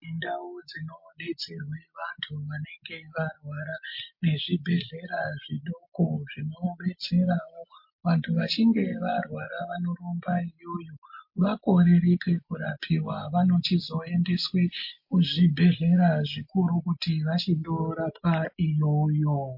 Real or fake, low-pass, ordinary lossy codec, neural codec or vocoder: real; 5.4 kHz; MP3, 32 kbps; none